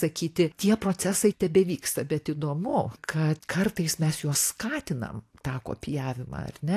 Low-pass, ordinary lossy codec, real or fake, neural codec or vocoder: 14.4 kHz; AAC, 64 kbps; real; none